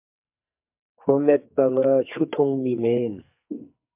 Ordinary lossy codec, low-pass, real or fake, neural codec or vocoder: MP3, 32 kbps; 3.6 kHz; fake; codec, 44.1 kHz, 2.6 kbps, SNAC